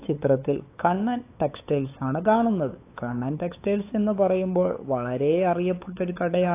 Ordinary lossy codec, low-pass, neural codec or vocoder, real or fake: AAC, 24 kbps; 3.6 kHz; codec, 16 kHz, 8 kbps, FunCodec, trained on LibriTTS, 25 frames a second; fake